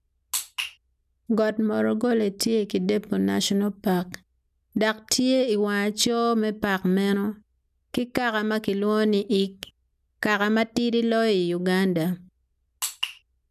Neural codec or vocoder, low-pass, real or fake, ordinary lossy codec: none; 14.4 kHz; real; none